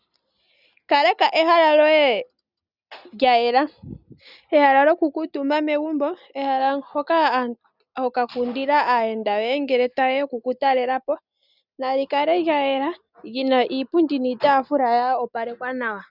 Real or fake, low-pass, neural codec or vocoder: real; 5.4 kHz; none